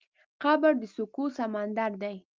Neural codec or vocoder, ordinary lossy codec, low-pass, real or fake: none; Opus, 24 kbps; 7.2 kHz; real